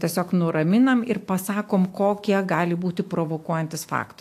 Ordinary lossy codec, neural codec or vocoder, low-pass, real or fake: AAC, 64 kbps; autoencoder, 48 kHz, 128 numbers a frame, DAC-VAE, trained on Japanese speech; 14.4 kHz; fake